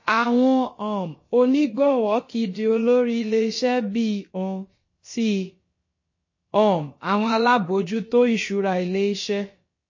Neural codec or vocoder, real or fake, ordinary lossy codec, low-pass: codec, 16 kHz, about 1 kbps, DyCAST, with the encoder's durations; fake; MP3, 32 kbps; 7.2 kHz